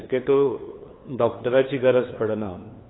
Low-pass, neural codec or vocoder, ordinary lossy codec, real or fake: 7.2 kHz; codec, 16 kHz, 2 kbps, FunCodec, trained on LibriTTS, 25 frames a second; AAC, 16 kbps; fake